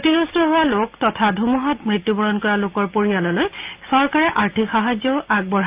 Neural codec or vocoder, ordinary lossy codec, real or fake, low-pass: none; Opus, 32 kbps; real; 3.6 kHz